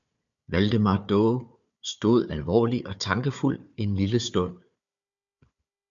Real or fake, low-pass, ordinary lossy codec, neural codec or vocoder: fake; 7.2 kHz; MP3, 64 kbps; codec, 16 kHz, 4 kbps, FunCodec, trained on Chinese and English, 50 frames a second